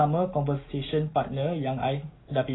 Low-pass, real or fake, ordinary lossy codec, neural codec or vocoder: 7.2 kHz; real; AAC, 16 kbps; none